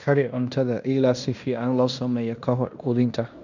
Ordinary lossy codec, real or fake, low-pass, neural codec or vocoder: none; fake; 7.2 kHz; codec, 16 kHz in and 24 kHz out, 0.9 kbps, LongCat-Audio-Codec, fine tuned four codebook decoder